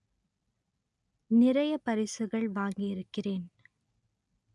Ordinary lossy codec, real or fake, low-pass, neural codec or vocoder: none; real; 10.8 kHz; none